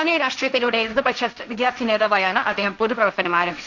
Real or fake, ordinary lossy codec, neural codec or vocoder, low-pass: fake; none; codec, 16 kHz, 1.1 kbps, Voila-Tokenizer; 7.2 kHz